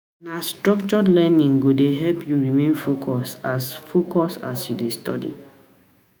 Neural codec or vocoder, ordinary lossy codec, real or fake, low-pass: autoencoder, 48 kHz, 128 numbers a frame, DAC-VAE, trained on Japanese speech; none; fake; none